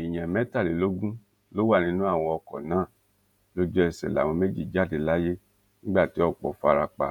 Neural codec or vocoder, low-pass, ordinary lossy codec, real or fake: vocoder, 48 kHz, 128 mel bands, Vocos; 19.8 kHz; none; fake